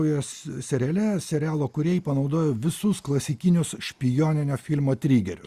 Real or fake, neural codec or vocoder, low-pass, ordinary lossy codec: real; none; 14.4 kHz; Opus, 64 kbps